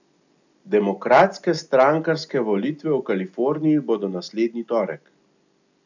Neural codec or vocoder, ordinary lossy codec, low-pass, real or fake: none; none; 7.2 kHz; real